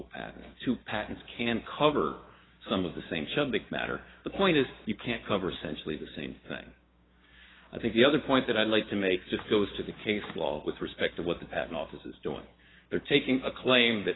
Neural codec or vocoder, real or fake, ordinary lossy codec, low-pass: codec, 16 kHz, 6 kbps, DAC; fake; AAC, 16 kbps; 7.2 kHz